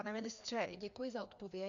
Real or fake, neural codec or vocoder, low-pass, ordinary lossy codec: fake; codec, 16 kHz, 2 kbps, FreqCodec, larger model; 7.2 kHz; AAC, 48 kbps